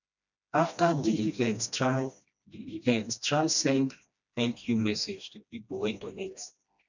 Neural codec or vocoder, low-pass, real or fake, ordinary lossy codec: codec, 16 kHz, 1 kbps, FreqCodec, smaller model; 7.2 kHz; fake; none